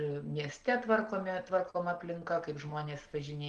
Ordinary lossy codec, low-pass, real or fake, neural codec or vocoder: Opus, 16 kbps; 10.8 kHz; real; none